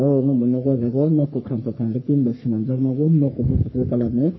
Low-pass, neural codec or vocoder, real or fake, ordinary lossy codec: 7.2 kHz; codec, 44.1 kHz, 3.4 kbps, Pupu-Codec; fake; MP3, 24 kbps